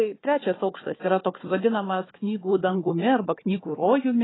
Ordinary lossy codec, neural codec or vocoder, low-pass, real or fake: AAC, 16 kbps; codec, 16 kHz, 4 kbps, FunCodec, trained on Chinese and English, 50 frames a second; 7.2 kHz; fake